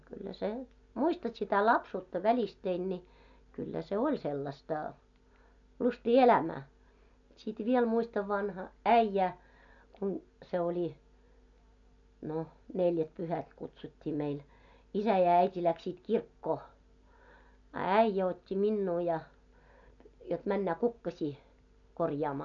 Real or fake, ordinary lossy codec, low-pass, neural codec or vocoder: real; none; 7.2 kHz; none